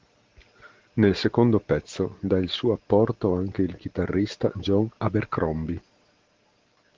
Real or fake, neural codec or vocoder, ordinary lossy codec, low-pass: real; none; Opus, 16 kbps; 7.2 kHz